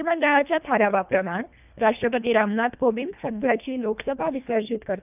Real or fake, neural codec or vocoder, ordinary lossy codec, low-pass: fake; codec, 24 kHz, 1.5 kbps, HILCodec; none; 3.6 kHz